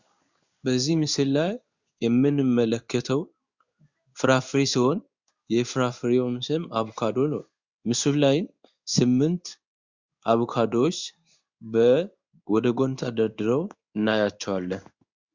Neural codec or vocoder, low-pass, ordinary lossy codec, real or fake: codec, 16 kHz in and 24 kHz out, 1 kbps, XY-Tokenizer; 7.2 kHz; Opus, 64 kbps; fake